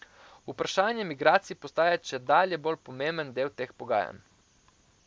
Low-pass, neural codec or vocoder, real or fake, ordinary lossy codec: none; none; real; none